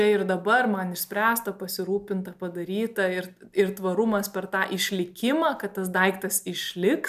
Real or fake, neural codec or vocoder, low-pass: real; none; 14.4 kHz